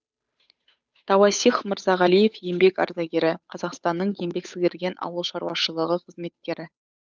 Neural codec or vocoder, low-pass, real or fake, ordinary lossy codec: codec, 16 kHz, 8 kbps, FunCodec, trained on Chinese and English, 25 frames a second; none; fake; none